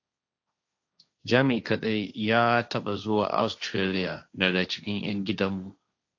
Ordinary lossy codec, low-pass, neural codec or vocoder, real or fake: AAC, 48 kbps; 7.2 kHz; codec, 16 kHz, 1.1 kbps, Voila-Tokenizer; fake